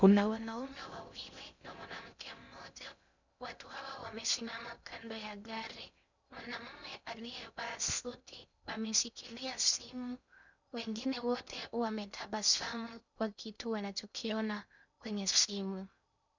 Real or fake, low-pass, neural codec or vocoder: fake; 7.2 kHz; codec, 16 kHz in and 24 kHz out, 0.6 kbps, FocalCodec, streaming, 2048 codes